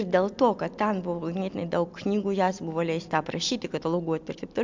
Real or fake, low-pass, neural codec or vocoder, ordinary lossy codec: real; 7.2 kHz; none; MP3, 64 kbps